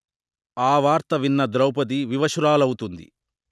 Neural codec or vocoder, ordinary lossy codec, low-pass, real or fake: none; none; none; real